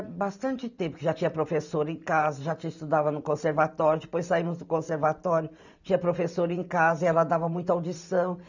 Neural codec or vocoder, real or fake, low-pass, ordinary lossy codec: vocoder, 44.1 kHz, 128 mel bands every 512 samples, BigVGAN v2; fake; 7.2 kHz; none